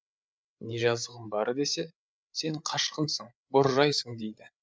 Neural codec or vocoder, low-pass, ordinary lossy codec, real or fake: codec, 16 kHz, 8 kbps, FreqCodec, larger model; none; none; fake